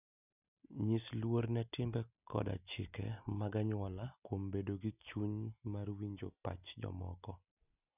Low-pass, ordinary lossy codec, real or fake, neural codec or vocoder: 3.6 kHz; none; real; none